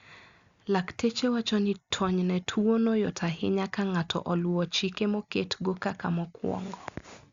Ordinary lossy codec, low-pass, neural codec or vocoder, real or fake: Opus, 64 kbps; 7.2 kHz; none; real